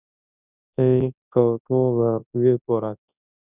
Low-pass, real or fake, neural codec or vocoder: 3.6 kHz; fake; codec, 24 kHz, 0.9 kbps, WavTokenizer, large speech release